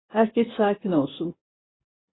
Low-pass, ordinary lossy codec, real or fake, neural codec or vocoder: 7.2 kHz; AAC, 16 kbps; fake; vocoder, 24 kHz, 100 mel bands, Vocos